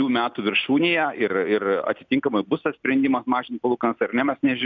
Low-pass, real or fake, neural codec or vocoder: 7.2 kHz; real; none